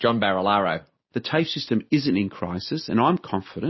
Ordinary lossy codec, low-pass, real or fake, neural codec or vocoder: MP3, 24 kbps; 7.2 kHz; real; none